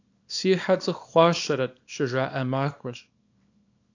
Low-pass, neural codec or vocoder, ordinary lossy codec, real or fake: 7.2 kHz; codec, 24 kHz, 0.9 kbps, WavTokenizer, small release; AAC, 48 kbps; fake